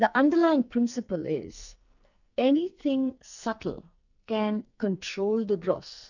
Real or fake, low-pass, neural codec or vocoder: fake; 7.2 kHz; codec, 44.1 kHz, 2.6 kbps, SNAC